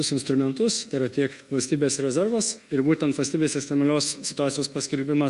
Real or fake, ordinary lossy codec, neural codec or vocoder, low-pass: fake; Opus, 64 kbps; codec, 24 kHz, 1.2 kbps, DualCodec; 10.8 kHz